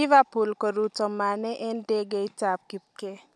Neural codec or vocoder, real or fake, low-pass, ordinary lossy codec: none; real; none; none